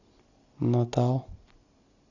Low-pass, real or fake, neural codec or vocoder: 7.2 kHz; real; none